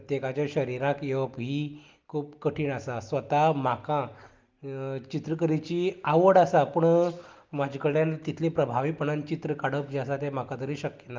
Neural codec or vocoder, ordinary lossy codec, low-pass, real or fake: none; Opus, 32 kbps; 7.2 kHz; real